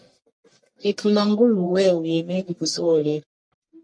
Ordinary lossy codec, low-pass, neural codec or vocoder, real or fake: MP3, 48 kbps; 9.9 kHz; codec, 44.1 kHz, 1.7 kbps, Pupu-Codec; fake